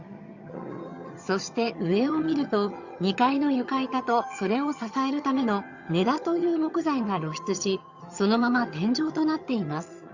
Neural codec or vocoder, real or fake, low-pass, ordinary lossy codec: vocoder, 22.05 kHz, 80 mel bands, HiFi-GAN; fake; 7.2 kHz; Opus, 64 kbps